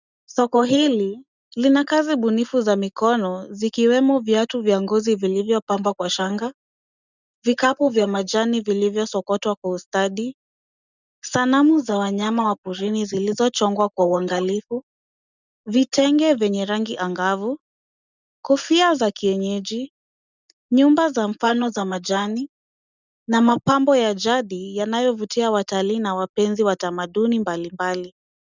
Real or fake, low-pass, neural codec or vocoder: real; 7.2 kHz; none